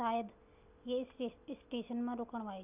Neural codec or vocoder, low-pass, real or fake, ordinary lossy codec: none; 3.6 kHz; real; none